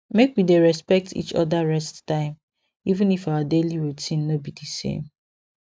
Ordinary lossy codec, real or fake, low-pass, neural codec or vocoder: none; real; none; none